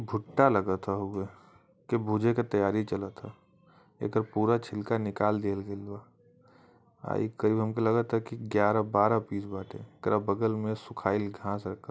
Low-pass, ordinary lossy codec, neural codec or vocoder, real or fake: none; none; none; real